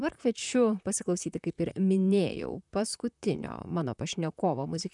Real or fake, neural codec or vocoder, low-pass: fake; vocoder, 44.1 kHz, 128 mel bands, Pupu-Vocoder; 10.8 kHz